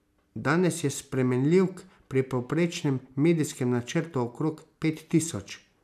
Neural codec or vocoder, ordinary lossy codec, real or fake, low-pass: none; none; real; 14.4 kHz